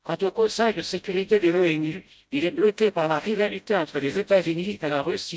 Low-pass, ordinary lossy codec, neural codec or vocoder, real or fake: none; none; codec, 16 kHz, 0.5 kbps, FreqCodec, smaller model; fake